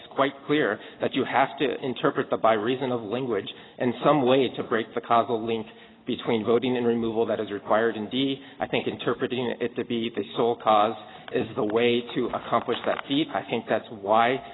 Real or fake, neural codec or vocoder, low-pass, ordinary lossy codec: real; none; 7.2 kHz; AAC, 16 kbps